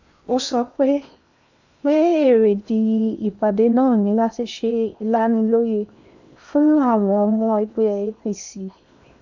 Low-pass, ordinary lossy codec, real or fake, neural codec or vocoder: 7.2 kHz; none; fake; codec, 16 kHz in and 24 kHz out, 0.8 kbps, FocalCodec, streaming, 65536 codes